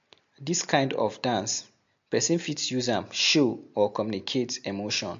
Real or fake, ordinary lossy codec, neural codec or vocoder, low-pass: real; MP3, 48 kbps; none; 7.2 kHz